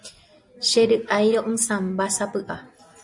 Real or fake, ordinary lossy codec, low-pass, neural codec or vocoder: real; MP3, 48 kbps; 10.8 kHz; none